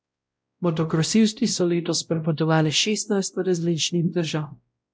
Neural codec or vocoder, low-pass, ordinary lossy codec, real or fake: codec, 16 kHz, 0.5 kbps, X-Codec, WavLM features, trained on Multilingual LibriSpeech; none; none; fake